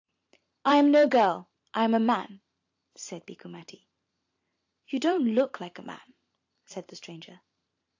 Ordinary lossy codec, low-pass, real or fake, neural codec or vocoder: AAC, 48 kbps; 7.2 kHz; fake; vocoder, 44.1 kHz, 128 mel bands every 512 samples, BigVGAN v2